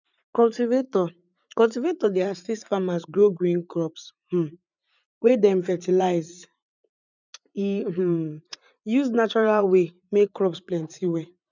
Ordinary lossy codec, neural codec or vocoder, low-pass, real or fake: none; vocoder, 44.1 kHz, 80 mel bands, Vocos; 7.2 kHz; fake